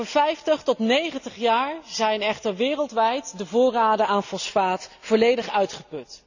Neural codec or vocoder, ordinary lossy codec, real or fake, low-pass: none; none; real; 7.2 kHz